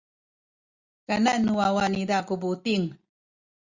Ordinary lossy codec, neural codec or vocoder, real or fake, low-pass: Opus, 64 kbps; none; real; 7.2 kHz